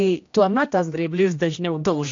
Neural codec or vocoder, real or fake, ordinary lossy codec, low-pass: codec, 16 kHz, 1 kbps, X-Codec, HuBERT features, trained on general audio; fake; AAC, 48 kbps; 7.2 kHz